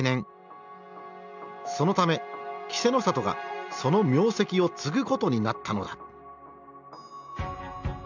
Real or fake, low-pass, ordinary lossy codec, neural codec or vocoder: real; 7.2 kHz; none; none